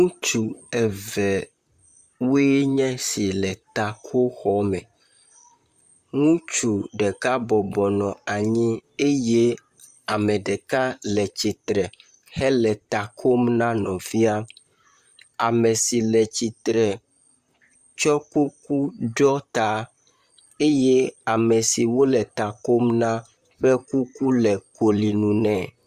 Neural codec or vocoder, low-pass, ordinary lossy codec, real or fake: vocoder, 44.1 kHz, 128 mel bands, Pupu-Vocoder; 14.4 kHz; Opus, 64 kbps; fake